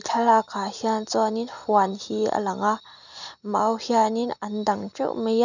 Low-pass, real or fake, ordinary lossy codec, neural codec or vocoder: 7.2 kHz; real; none; none